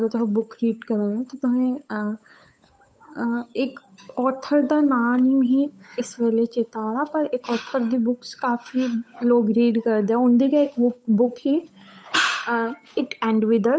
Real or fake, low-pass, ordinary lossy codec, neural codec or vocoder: fake; none; none; codec, 16 kHz, 8 kbps, FunCodec, trained on Chinese and English, 25 frames a second